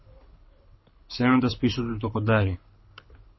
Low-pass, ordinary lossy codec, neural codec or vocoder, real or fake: 7.2 kHz; MP3, 24 kbps; codec, 24 kHz, 6 kbps, HILCodec; fake